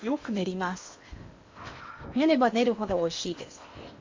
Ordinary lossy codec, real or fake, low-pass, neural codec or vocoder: MP3, 48 kbps; fake; 7.2 kHz; codec, 16 kHz in and 24 kHz out, 0.8 kbps, FocalCodec, streaming, 65536 codes